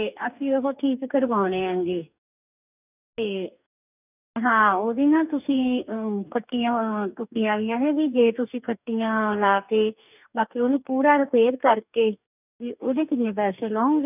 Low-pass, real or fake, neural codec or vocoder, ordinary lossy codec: 3.6 kHz; fake; codec, 44.1 kHz, 2.6 kbps, DAC; none